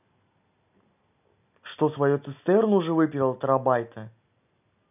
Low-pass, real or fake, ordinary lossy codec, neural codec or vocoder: 3.6 kHz; real; none; none